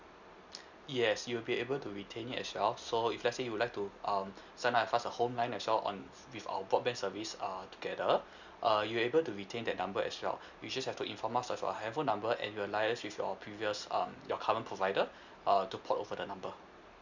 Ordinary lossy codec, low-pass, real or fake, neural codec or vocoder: none; 7.2 kHz; real; none